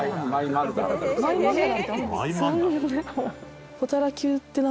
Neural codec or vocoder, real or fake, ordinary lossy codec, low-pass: none; real; none; none